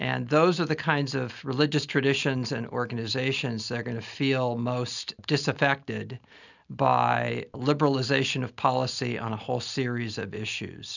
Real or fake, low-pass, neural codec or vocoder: real; 7.2 kHz; none